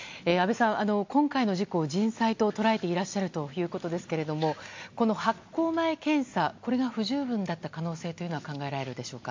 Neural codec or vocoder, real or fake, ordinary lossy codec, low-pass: none; real; MP3, 48 kbps; 7.2 kHz